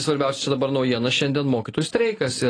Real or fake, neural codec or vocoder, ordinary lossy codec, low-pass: real; none; AAC, 32 kbps; 9.9 kHz